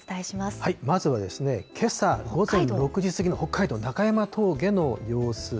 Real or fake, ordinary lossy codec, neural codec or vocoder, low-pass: real; none; none; none